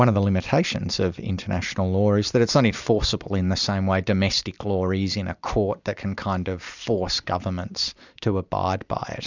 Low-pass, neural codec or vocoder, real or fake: 7.2 kHz; vocoder, 44.1 kHz, 128 mel bands every 256 samples, BigVGAN v2; fake